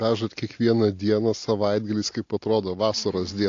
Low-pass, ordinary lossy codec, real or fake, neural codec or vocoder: 7.2 kHz; AAC, 48 kbps; real; none